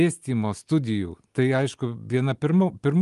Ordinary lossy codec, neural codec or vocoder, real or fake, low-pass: Opus, 32 kbps; none; real; 10.8 kHz